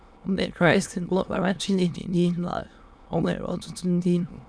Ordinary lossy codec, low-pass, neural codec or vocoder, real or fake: none; none; autoencoder, 22.05 kHz, a latent of 192 numbers a frame, VITS, trained on many speakers; fake